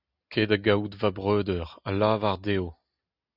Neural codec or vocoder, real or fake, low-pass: none; real; 5.4 kHz